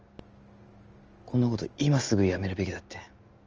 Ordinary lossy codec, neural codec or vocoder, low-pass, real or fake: Opus, 24 kbps; none; 7.2 kHz; real